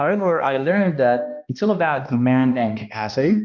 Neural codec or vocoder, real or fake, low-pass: codec, 16 kHz, 1 kbps, X-Codec, HuBERT features, trained on balanced general audio; fake; 7.2 kHz